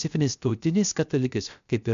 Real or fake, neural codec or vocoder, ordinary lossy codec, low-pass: fake; codec, 16 kHz, 0.3 kbps, FocalCodec; MP3, 64 kbps; 7.2 kHz